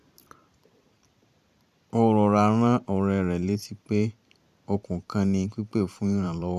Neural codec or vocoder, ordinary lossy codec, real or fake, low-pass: vocoder, 44.1 kHz, 128 mel bands every 512 samples, BigVGAN v2; none; fake; 14.4 kHz